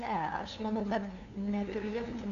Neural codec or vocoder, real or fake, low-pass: codec, 16 kHz, 2 kbps, FunCodec, trained on LibriTTS, 25 frames a second; fake; 7.2 kHz